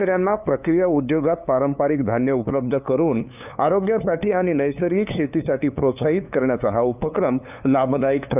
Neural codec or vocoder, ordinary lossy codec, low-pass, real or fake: codec, 16 kHz, 2 kbps, FunCodec, trained on LibriTTS, 25 frames a second; none; 3.6 kHz; fake